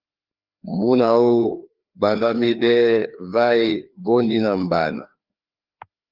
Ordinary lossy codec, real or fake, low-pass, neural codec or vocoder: Opus, 32 kbps; fake; 5.4 kHz; codec, 16 kHz, 2 kbps, FreqCodec, larger model